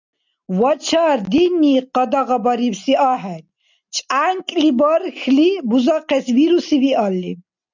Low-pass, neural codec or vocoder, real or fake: 7.2 kHz; none; real